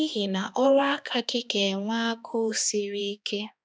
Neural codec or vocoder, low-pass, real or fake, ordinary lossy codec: codec, 16 kHz, 2 kbps, X-Codec, HuBERT features, trained on balanced general audio; none; fake; none